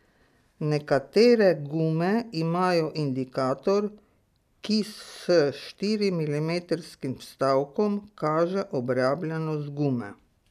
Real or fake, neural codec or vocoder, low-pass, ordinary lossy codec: real; none; 14.4 kHz; none